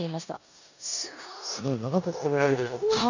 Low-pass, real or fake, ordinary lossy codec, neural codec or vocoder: 7.2 kHz; fake; none; codec, 16 kHz in and 24 kHz out, 0.9 kbps, LongCat-Audio-Codec, four codebook decoder